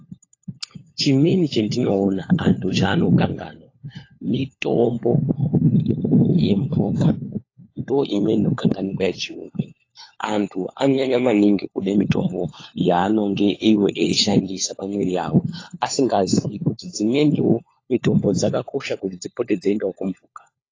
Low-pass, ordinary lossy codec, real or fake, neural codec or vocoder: 7.2 kHz; AAC, 32 kbps; fake; codec, 16 kHz, 4 kbps, FunCodec, trained on LibriTTS, 50 frames a second